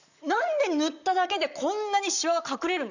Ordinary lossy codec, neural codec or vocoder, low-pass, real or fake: none; vocoder, 44.1 kHz, 128 mel bands, Pupu-Vocoder; 7.2 kHz; fake